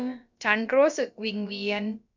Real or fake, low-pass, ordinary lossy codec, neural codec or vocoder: fake; 7.2 kHz; none; codec, 16 kHz, about 1 kbps, DyCAST, with the encoder's durations